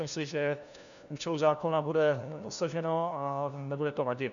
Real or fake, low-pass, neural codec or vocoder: fake; 7.2 kHz; codec, 16 kHz, 1 kbps, FunCodec, trained on LibriTTS, 50 frames a second